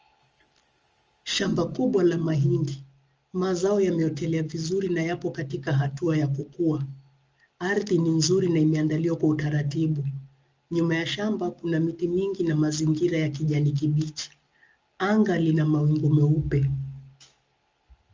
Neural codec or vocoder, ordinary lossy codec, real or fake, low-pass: none; Opus, 24 kbps; real; 7.2 kHz